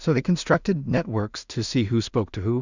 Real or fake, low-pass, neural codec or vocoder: fake; 7.2 kHz; codec, 16 kHz in and 24 kHz out, 0.4 kbps, LongCat-Audio-Codec, two codebook decoder